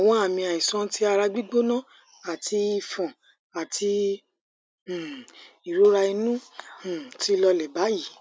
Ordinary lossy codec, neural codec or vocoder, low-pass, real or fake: none; none; none; real